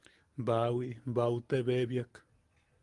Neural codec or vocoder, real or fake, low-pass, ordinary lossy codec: none; real; 10.8 kHz; Opus, 24 kbps